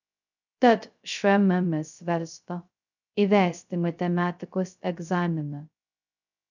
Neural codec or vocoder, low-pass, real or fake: codec, 16 kHz, 0.2 kbps, FocalCodec; 7.2 kHz; fake